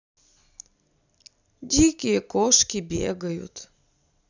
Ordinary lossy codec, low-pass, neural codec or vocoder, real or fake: none; 7.2 kHz; none; real